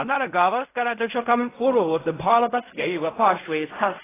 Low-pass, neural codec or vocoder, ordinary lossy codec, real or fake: 3.6 kHz; codec, 16 kHz in and 24 kHz out, 0.4 kbps, LongCat-Audio-Codec, fine tuned four codebook decoder; AAC, 16 kbps; fake